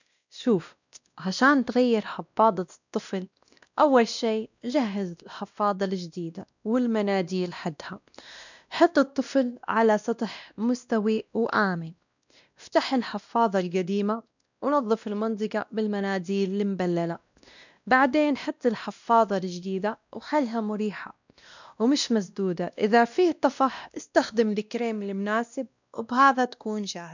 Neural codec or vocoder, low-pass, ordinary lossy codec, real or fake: codec, 16 kHz, 1 kbps, X-Codec, WavLM features, trained on Multilingual LibriSpeech; 7.2 kHz; none; fake